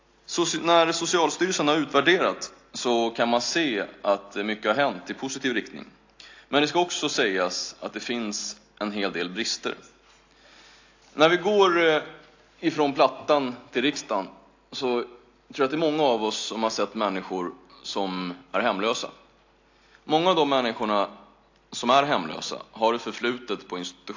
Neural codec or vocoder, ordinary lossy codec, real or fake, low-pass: none; AAC, 48 kbps; real; 7.2 kHz